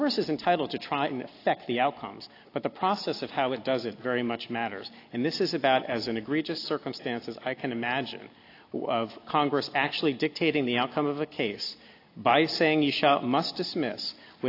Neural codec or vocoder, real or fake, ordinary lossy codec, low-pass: none; real; AAC, 32 kbps; 5.4 kHz